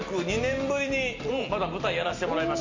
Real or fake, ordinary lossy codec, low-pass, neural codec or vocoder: real; MP3, 48 kbps; 7.2 kHz; none